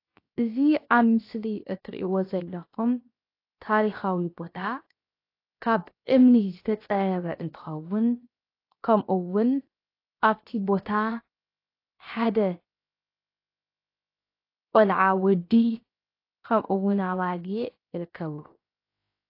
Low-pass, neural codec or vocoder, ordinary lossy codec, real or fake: 5.4 kHz; codec, 16 kHz, 0.7 kbps, FocalCodec; AAC, 32 kbps; fake